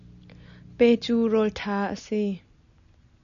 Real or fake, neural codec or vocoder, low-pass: real; none; 7.2 kHz